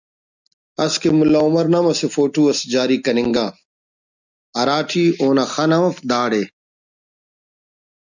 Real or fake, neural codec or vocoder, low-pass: real; none; 7.2 kHz